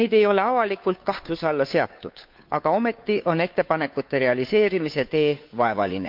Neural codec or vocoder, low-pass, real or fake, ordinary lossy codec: codec, 16 kHz, 2 kbps, FunCodec, trained on Chinese and English, 25 frames a second; 5.4 kHz; fake; MP3, 48 kbps